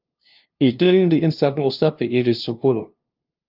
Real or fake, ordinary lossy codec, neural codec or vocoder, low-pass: fake; Opus, 24 kbps; codec, 16 kHz, 0.5 kbps, FunCodec, trained on LibriTTS, 25 frames a second; 5.4 kHz